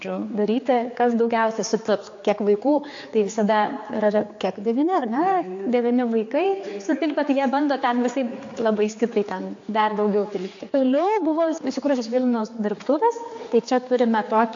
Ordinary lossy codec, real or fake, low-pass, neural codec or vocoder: AAC, 48 kbps; fake; 7.2 kHz; codec, 16 kHz, 4 kbps, X-Codec, HuBERT features, trained on general audio